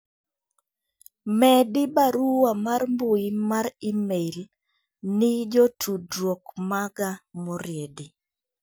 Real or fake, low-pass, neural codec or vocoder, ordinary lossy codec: real; none; none; none